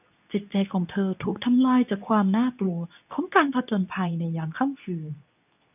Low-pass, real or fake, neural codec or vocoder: 3.6 kHz; fake; codec, 24 kHz, 0.9 kbps, WavTokenizer, medium speech release version 2